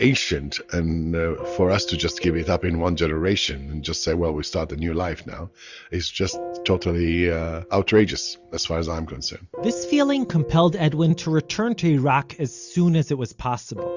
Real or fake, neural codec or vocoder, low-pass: real; none; 7.2 kHz